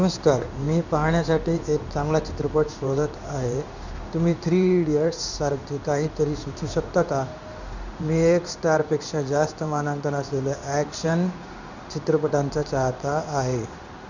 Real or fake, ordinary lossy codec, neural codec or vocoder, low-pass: fake; none; codec, 16 kHz in and 24 kHz out, 1 kbps, XY-Tokenizer; 7.2 kHz